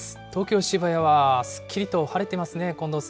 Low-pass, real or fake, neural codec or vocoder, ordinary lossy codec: none; real; none; none